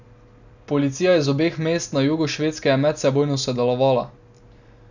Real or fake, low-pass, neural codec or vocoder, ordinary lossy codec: real; 7.2 kHz; none; none